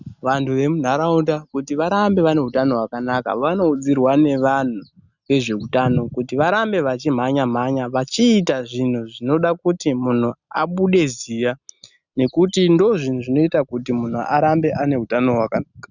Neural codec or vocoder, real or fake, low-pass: none; real; 7.2 kHz